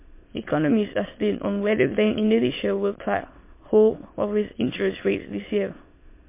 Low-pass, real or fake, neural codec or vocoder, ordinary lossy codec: 3.6 kHz; fake; autoencoder, 22.05 kHz, a latent of 192 numbers a frame, VITS, trained on many speakers; MP3, 24 kbps